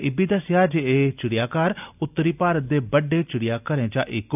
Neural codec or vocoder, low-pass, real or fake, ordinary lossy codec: none; 3.6 kHz; real; none